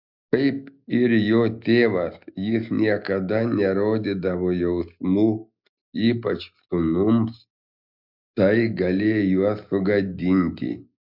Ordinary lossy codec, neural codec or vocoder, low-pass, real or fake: MP3, 48 kbps; none; 5.4 kHz; real